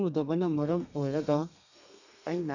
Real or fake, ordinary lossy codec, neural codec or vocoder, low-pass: fake; none; codec, 32 kHz, 1.9 kbps, SNAC; 7.2 kHz